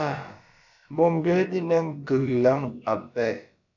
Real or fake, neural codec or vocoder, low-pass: fake; codec, 16 kHz, about 1 kbps, DyCAST, with the encoder's durations; 7.2 kHz